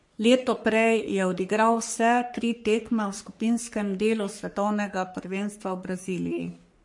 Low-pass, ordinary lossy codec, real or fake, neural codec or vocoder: 10.8 kHz; MP3, 48 kbps; fake; codec, 44.1 kHz, 3.4 kbps, Pupu-Codec